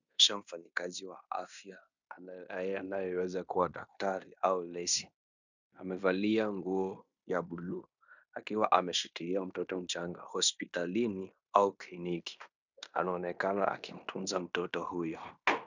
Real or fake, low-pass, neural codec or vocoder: fake; 7.2 kHz; codec, 16 kHz in and 24 kHz out, 0.9 kbps, LongCat-Audio-Codec, fine tuned four codebook decoder